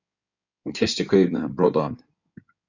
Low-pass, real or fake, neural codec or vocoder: 7.2 kHz; fake; codec, 16 kHz in and 24 kHz out, 2.2 kbps, FireRedTTS-2 codec